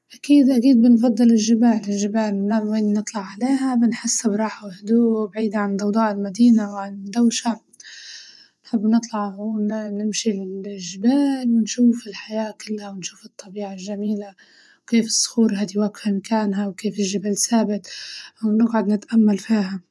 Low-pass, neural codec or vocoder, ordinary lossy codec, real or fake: none; vocoder, 24 kHz, 100 mel bands, Vocos; none; fake